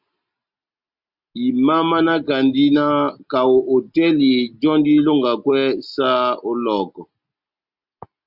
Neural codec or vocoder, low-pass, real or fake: none; 5.4 kHz; real